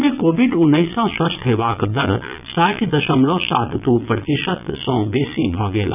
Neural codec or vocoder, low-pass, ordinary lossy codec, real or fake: vocoder, 22.05 kHz, 80 mel bands, Vocos; 3.6 kHz; none; fake